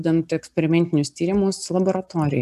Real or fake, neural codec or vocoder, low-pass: real; none; 14.4 kHz